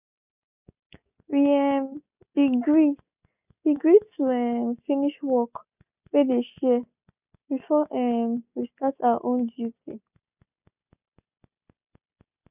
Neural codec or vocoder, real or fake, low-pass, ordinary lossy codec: none; real; 3.6 kHz; none